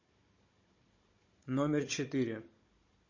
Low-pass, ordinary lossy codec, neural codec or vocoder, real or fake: 7.2 kHz; MP3, 32 kbps; codec, 16 kHz, 16 kbps, FunCodec, trained on Chinese and English, 50 frames a second; fake